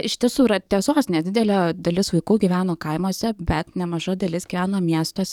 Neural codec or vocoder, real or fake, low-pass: codec, 44.1 kHz, 7.8 kbps, DAC; fake; 19.8 kHz